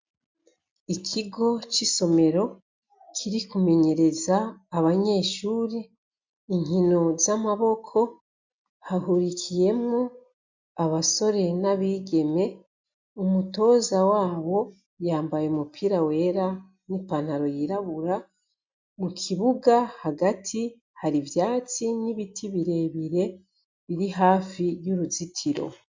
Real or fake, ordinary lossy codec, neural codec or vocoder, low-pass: real; MP3, 64 kbps; none; 7.2 kHz